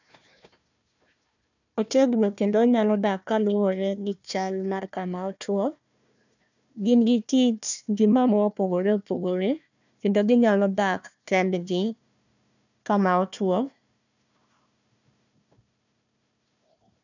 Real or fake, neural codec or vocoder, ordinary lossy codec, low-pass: fake; codec, 16 kHz, 1 kbps, FunCodec, trained on Chinese and English, 50 frames a second; none; 7.2 kHz